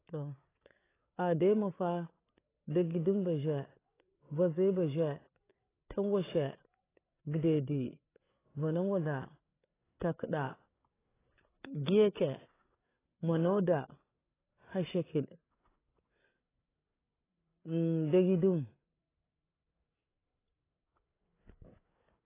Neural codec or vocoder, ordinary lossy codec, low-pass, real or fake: codec, 16 kHz, 8 kbps, FreqCodec, larger model; AAC, 16 kbps; 3.6 kHz; fake